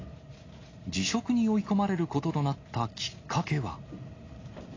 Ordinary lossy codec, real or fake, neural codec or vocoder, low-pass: AAC, 32 kbps; real; none; 7.2 kHz